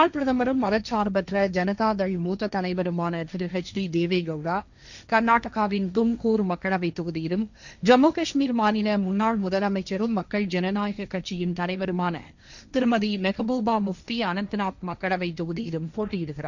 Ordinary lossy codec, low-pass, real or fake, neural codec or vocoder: none; 7.2 kHz; fake; codec, 16 kHz, 1.1 kbps, Voila-Tokenizer